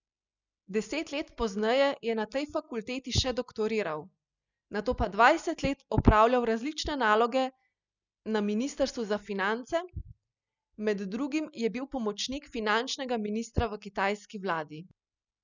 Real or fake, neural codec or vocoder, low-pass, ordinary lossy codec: real; none; 7.2 kHz; none